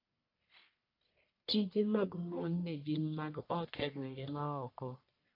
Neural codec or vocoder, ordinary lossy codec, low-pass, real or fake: codec, 44.1 kHz, 1.7 kbps, Pupu-Codec; AAC, 32 kbps; 5.4 kHz; fake